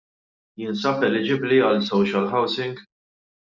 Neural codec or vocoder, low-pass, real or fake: none; 7.2 kHz; real